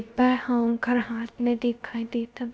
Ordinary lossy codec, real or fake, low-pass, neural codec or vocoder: none; fake; none; codec, 16 kHz, about 1 kbps, DyCAST, with the encoder's durations